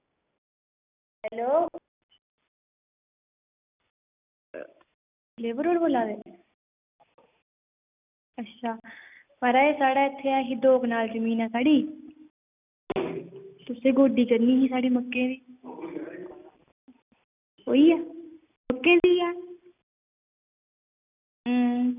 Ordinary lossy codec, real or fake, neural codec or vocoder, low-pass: none; real; none; 3.6 kHz